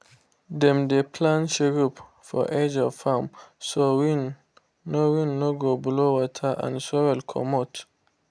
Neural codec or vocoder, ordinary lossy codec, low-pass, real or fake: none; none; none; real